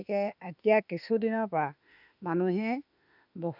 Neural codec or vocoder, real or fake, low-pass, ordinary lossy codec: autoencoder, 48 kHz, 32 numbers a frame, DAC-VAE, trained on Japanese speech; fake; 5.4 kHz; none